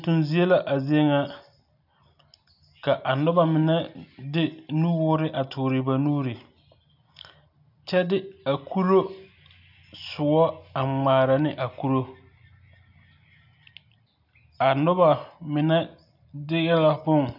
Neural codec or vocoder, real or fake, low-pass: none; real; 5.4 kHz